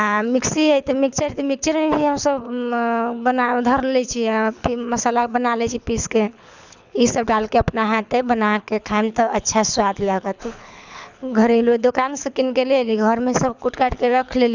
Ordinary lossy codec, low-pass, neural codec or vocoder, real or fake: none; 7.2 kHz; codec, 24 kHz, 6 kbps, HILCodec; fake